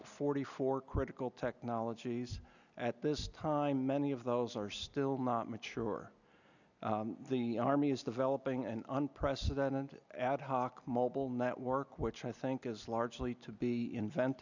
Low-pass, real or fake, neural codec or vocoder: 7.2 kHz; real; none